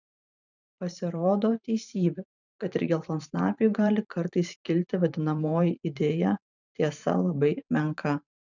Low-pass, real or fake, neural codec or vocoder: 7.2 kHz; fake; vocoder, 44.1 kHz, 128 mel bands every 512 samples, BigVGAN v2